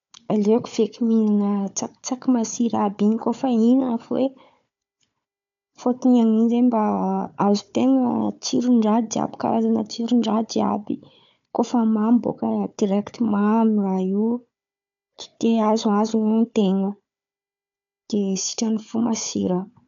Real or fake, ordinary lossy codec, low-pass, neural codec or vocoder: fake; MP3, 96 kbps; 7.2 kHz; codec, 16 kHz, 4 kbps, FunCodec, trained on Chinese and English, 50 frames a second